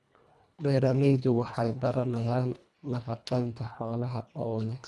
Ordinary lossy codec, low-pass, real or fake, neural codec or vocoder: none; none; fake; codec, 24 kHz, 1.5 kbps, HILCodec